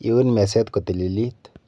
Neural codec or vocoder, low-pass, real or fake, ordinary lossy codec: none; none; real; none